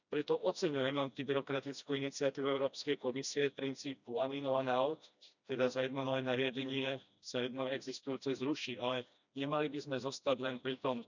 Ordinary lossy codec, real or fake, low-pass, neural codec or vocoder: none; fake; 7.2 kHz; codec, 16 kHz, 1 kbps, FreqCodec, smaller model